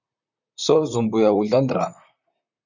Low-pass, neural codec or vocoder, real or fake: 7.2 kHz; vocoder, 44.1 kHz, 128 mel bands, Pupu-Vocoder; fake